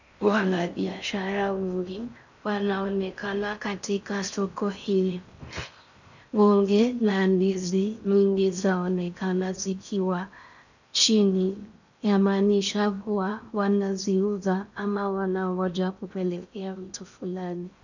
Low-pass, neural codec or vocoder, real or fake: 7.2 kHz; codec, 16 kHz in and 24 kHz out, 0.6 kbps, FocalCodec, streaming, 4096 codes; fake